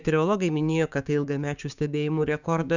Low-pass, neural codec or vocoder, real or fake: 7.2 kHz; codec, 16 kHz, 6 kbps, DAC; fake